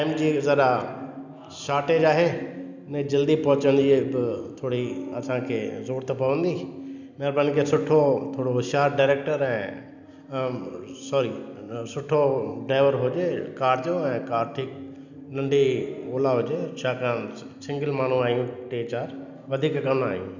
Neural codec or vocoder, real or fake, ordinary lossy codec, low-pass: none; real; none; 7.2 kHz